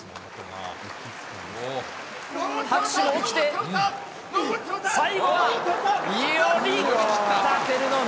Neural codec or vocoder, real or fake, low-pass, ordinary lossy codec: none; real; none; none